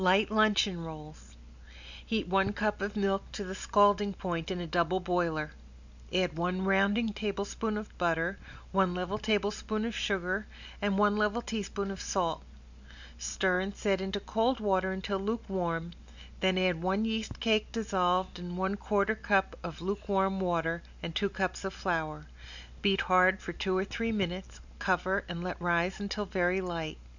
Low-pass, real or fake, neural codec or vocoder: 7.2 kHz; real; none